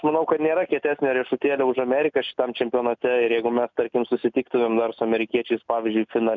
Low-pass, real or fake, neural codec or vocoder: 7.2 kHz; real; none